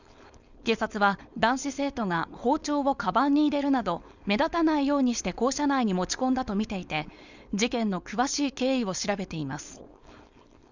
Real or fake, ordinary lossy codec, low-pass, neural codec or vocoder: fake; Opus, 64 kbps; 7.2 kHz; codec, 16 kHz, 4.8 kbps, FACodec